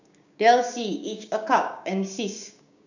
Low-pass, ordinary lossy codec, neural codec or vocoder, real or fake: 7.2 kHz; none; codec, 16 kHz, 6 kbps, DAC; fake